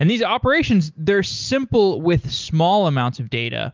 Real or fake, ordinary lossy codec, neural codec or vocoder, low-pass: real; Opus, 32 kbps; none; 7.2 kHz